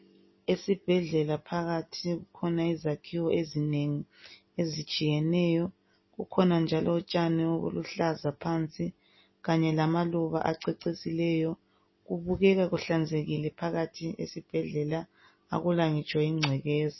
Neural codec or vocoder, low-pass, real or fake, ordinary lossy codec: none; 7.2 kHz; real; MP3, 24 kbps